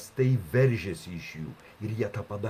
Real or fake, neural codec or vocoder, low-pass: real; none; 14.4 kHz